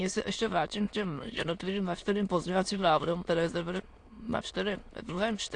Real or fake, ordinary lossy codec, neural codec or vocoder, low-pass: fake; AAC, 48 kbps; autoencoder, 22.05 kHz, a latent of 192 numbers a frame, VITS, trained on many speakers; 9.9 kHz